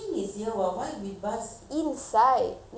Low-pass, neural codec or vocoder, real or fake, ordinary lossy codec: none; none; real; none